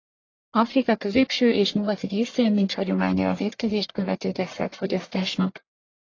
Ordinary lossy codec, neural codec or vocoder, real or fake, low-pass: AAC, 32 kbps; codec, 44.1 kHz, 1.7 kbps, Pupu-Codec; fake; 7.2 kHz